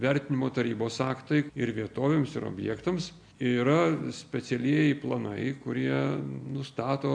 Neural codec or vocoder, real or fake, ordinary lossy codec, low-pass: none; real; AAC, 64 kbps; 9.9 kHz